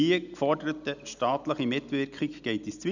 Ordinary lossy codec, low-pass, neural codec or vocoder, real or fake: none; 7.2 kHz; none; real